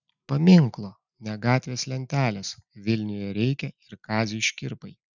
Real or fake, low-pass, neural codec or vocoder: real; 7.2 kHz; none